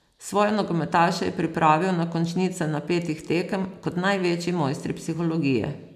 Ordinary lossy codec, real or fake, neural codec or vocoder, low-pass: none; real; none; 14.4 kHz